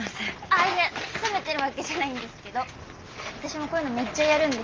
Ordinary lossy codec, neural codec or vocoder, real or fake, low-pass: Opus, 24 kbps; none; real; 7.2 kHz